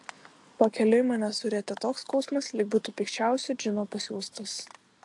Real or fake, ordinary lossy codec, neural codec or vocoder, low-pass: real; AAC, 64 kbps; none; 10.8 kHz